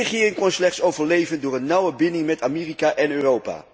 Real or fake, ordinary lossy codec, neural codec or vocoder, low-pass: real; none; none; none